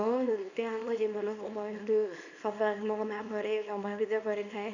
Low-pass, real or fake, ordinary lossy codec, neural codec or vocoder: 7.2 kHz; fake; none; codec, 24 kHz, 0.9 kbps, WavTokenizer, small release